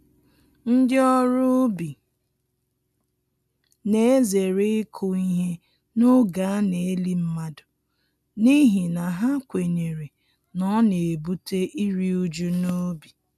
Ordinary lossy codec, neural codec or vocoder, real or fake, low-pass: none; none; real; 14.4 kHz